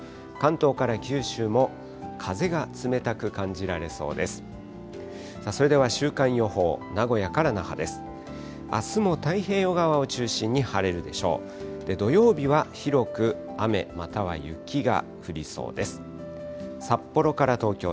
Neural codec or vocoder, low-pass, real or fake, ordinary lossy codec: none; none; real; none